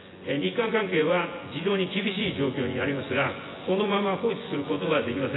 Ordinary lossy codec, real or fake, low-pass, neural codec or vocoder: AAC, 16 kbps; fake; 7.2 kHz; vocoder, 24 kHz, 100 mel bands, Vocos